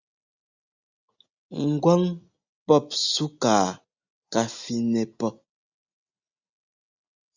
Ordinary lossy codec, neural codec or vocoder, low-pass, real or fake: Opus, 64 kbps; none; 7.2 kHz; real